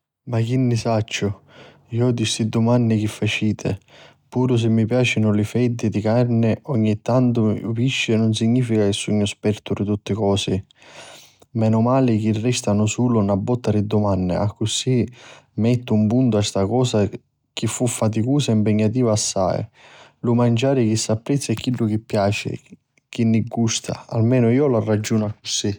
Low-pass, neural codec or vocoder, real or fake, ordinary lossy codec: 19.8 kHz; none; real; none